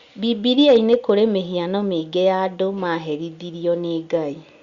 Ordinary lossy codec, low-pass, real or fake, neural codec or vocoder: Opus, 64 kbps; 7.2 kHz; real; none